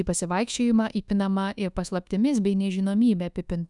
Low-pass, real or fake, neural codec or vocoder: 10.8 kHz; fake; codec, 24 kHz, 1.2 kbps, DualCodec